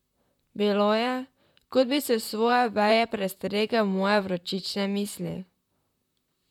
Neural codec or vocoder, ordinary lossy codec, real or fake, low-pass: vocoder, 44.1 kHz, 128 mel bands, Pupu-Vocoder; none; fake; 19.8 kHz